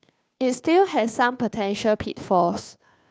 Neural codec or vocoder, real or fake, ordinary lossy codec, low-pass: codec, 16 kHz, 2 kbps, FunCodec, trained on Chinese and English, 25 frames a second; fake; none; none